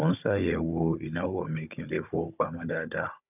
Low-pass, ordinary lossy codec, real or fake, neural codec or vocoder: 3.6 kHz; none; fake; codec, 16 kHz, 16 kbps, FunCodec, trained on Chinese and English, 50 frames a second